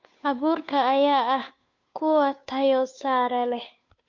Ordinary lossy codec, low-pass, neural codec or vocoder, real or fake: MP3, 48 kbps; 7.2 kHz; codec, 16 kHz, 2 kbps, FunCodec, trained on Chinese and English, 25 frames a second; fake